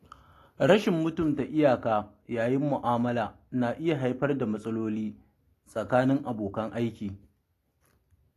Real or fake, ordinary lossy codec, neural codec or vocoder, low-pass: real; AAC, 48 kbps; none; 14.4 kHz